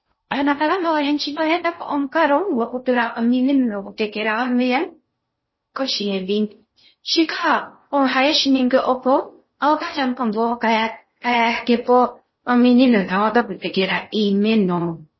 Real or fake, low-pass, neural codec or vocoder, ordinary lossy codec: fake; 7.2 kHz; codec, 16 kHz in and 24 kHz out, 0.6 kbps, FocalCodec, streaming, 2048 codes; MP3, 24 kbps